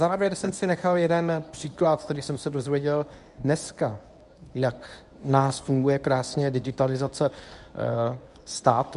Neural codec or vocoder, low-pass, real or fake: codec, 24 kHz, 0.9 kbps, WavTokenizer, medium speech release version 1; 10.8 kHz; fake